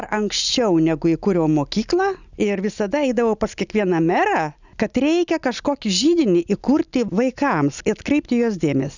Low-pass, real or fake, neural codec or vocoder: 7.2 kHz; real; none